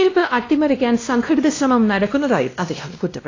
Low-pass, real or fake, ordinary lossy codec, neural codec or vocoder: 7.2 kHz; fake; AAC, 32 kbps; codec, 16 kHz, 1 kbps, X-Codec, WavLM features, trained on Multilingual LibriSpeech